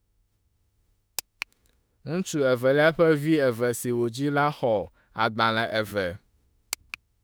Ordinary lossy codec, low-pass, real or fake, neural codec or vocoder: none; none; fake; autoencoder, 48 kHz, 32 numbers a frame, DAC-VAE, trained on Japanese speech